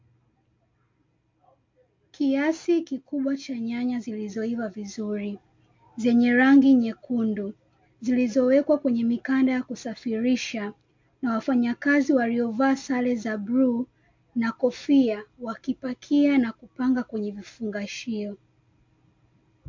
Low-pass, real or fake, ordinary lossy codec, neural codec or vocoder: 7.2 kHz; real; MP3, 48 kbps; none